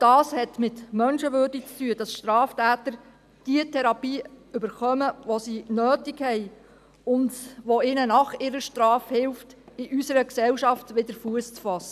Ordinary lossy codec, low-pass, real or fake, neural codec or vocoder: none; 14.4 kHz; real; none